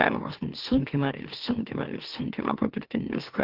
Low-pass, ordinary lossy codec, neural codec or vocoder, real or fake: 5.4 kHz; Opus, 16 kbps; autoencoder, 44.1 kHz, a latent of 192 numbers a frame, MeloTTS; fake